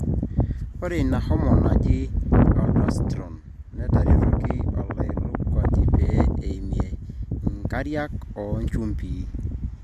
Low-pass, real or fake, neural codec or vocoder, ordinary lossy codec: 14.4 kHz; real; none; MP3, 64 kbps